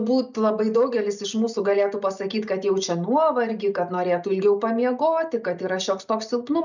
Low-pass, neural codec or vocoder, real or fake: 7.2 kHz; none; real